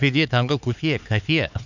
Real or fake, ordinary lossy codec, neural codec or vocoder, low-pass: fake; none; codec, 16 kHz, 4 kbps, X-Codec, HuBERT features, trained on LibriSpeech; 7.2 kHz